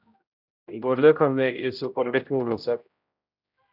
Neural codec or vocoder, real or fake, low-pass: codec, 16 kHz, 0.5 kbps, X-Codec, HuBERT features, trained on general audio; fake; 5.4 kHz